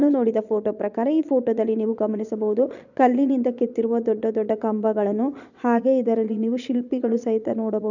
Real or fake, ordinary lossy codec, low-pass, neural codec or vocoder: fake; none; 7.2 kHz; vocoder, 22.05 kHz, 80 mel bands, Vocos